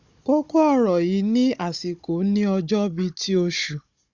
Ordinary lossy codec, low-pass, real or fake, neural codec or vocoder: Opus, 64 kbps; 7.2 kHz; fake; codec, 16 kHz, 16 kbps, FunCodec, trained on Chinese and English, 50 frames a second